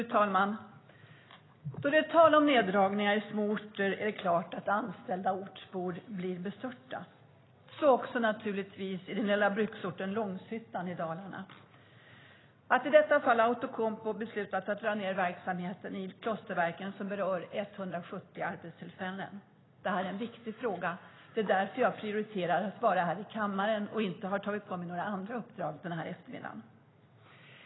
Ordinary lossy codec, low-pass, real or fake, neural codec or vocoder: AAC, 16 kbps; 7.2 kHz; real; none